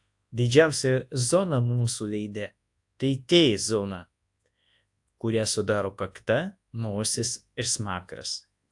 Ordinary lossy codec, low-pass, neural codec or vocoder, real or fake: AAC, 64 kbps; 10.8 kHz; codec, 24 kHz, 0.9 kbps, WavTokenizer, large speech release; fake